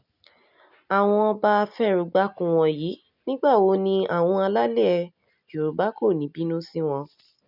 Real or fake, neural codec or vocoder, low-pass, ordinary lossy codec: real; none; 5.4 kHz; none